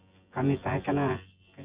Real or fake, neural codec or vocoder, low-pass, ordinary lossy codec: fake; vocoder, 24 kHz, 100 mel bands, Vocos; 3.6 kHz; Opus, 64 kbps